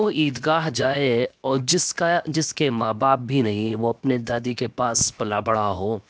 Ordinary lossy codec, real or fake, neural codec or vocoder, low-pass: none; fake; codec, 16 kHz, 0.7 kbps, FocalCodec; none